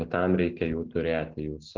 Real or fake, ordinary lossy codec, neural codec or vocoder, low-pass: real; Opus, 16 kbps; none; 7.2 kHz